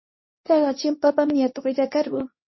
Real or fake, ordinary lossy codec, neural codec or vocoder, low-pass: fake; MP3, 24 kbps; codec, 24 kHz, 0.9 kbps, WavTokenizer, medium speech release version 2; 7.2 kHz